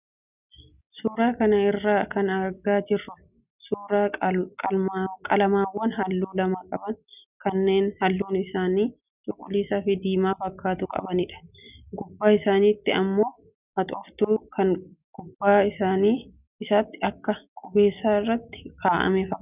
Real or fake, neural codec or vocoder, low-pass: real; none; 3.6 kHz